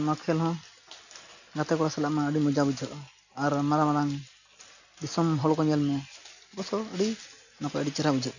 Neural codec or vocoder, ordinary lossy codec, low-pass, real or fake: none; none; 7.2 kHz; real